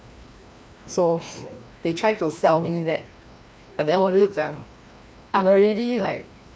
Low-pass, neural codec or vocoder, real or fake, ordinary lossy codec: none; codec, 16 kHz, 1 kbps, FreqCodec, larger model; fake; none